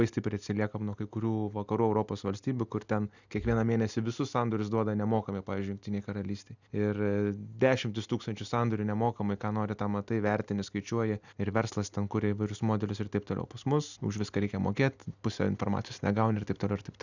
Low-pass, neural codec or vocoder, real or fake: 7.2 kHz; none; real